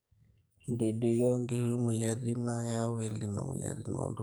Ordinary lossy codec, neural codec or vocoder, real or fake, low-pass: none; codec, 44.1 kHz, 2.6 kbps, SNAC; fake; none